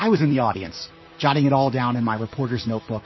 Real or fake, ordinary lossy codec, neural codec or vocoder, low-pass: fake; MP3, 24 kbps; vocoder, 44.1 kHz, 128 mel bands, Pupu-Vocoder; 7.2 kHz